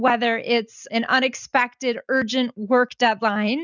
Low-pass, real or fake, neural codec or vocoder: 7.2 kHz; real; none